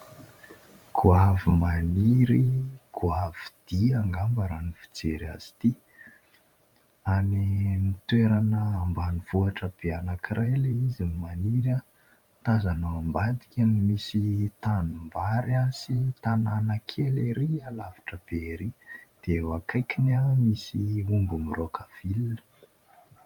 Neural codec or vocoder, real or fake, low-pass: vocoder, 44.1 kHz, 128 mel bands every 512 samples, BigVGAN v2; fake; 19.8 kHz